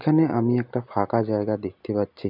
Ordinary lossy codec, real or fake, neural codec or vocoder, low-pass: none; real; none; 5.4 kHz